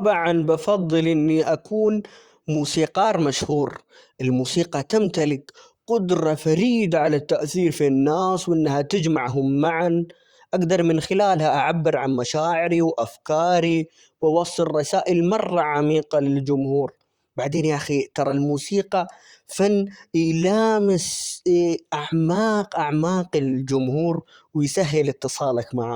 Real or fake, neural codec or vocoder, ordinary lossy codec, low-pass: fake; vocoder, 44.1 kHz, 128 mel bands, Pupu-Vocoder; Opus, 64 kbps; 19.8 kHz